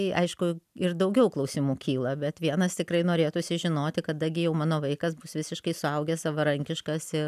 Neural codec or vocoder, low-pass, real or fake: none; 14.4 kHz; real